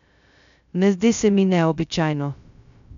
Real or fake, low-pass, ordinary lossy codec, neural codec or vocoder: fake; 7.2 kHz; none; codec, 16 kHz, 0.2 kbps, FocalCodec